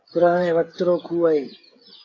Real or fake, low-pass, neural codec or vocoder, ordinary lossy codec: fake; 7.2 kHz; codec, 16 kHz, 16 kbps, FreqCodec, smaller model; AAC, 32 kbps